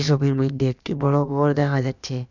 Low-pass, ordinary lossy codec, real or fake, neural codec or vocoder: 7.2 kHz; none; fake; codec, 16 kHz, about 1 kbps, DyCAST, with the encoder's durations